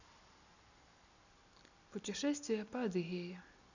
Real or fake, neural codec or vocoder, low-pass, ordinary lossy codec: real; none; 7.2 kHz; none